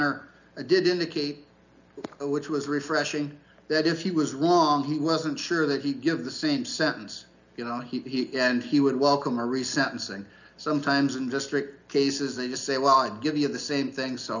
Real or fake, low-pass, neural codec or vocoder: real; 7.2 kHz; none